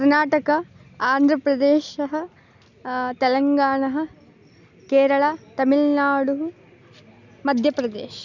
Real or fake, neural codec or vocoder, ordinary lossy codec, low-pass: real; none; none; 7.2 kHz